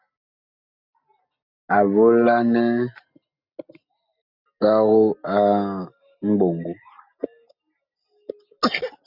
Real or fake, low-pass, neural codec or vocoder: real; 5.4 kHz; none